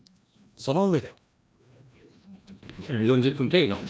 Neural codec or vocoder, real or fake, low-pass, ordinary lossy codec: codec, 16 kHz, 1 kbps, FreqCodec, larger model; fake; none; none